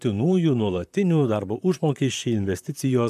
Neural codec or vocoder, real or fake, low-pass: vocoder, 44.1 kHz, 128 mel bands, Pupu-Vocoder; fake; 14.4 kHz